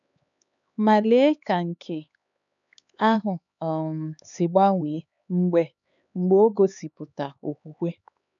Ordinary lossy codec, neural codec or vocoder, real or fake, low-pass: none; codec, 16 kHz, 4 kbps, X-Codec, HuBERT features, trained on LibriSpeech; fake; 7.2 kHz